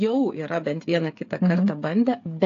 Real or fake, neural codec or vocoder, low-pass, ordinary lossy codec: fake; codec, 16 kHz, 8 kbps, FreqCodec, smaller model; 7.2 kHz; AAC, 48 kbps